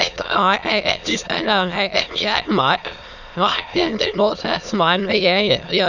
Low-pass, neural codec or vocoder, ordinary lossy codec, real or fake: 7.2 kHz; autoencoder, 22.05 kHz, a latent of 192 numbers a frame, VITS, trained on many speakers; none; fake